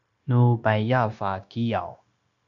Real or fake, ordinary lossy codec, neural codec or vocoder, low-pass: fake; AAC, 64 kbps; codec, 16 kHz, 0.9 kbps, LongCat-Audio-Codec; 7.2 kHz